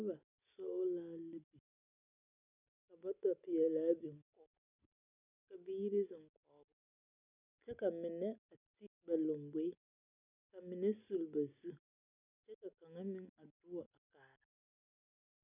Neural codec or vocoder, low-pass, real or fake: none; 3.6 kHz; real